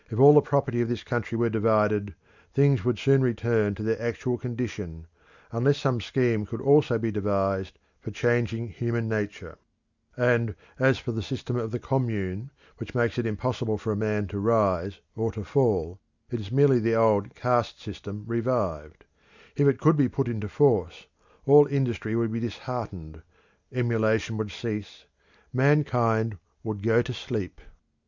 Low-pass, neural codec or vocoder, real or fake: 7.2 kHz; none; real